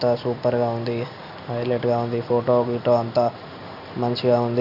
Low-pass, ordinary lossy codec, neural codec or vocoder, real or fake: 5.4 kHz; none; none; real